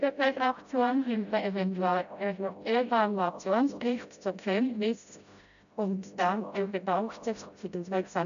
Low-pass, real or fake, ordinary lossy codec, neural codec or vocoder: 7.2 kHz; fake; none; codec, 16 kHz, 0.5 kbps, FreqCodec, smaller model